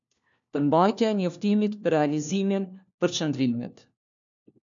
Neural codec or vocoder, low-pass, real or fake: codec, 16 kHz, 1 kbps, FunCodec, trained on LibriTTS, 50 frames a second; 7.2 kHz; fake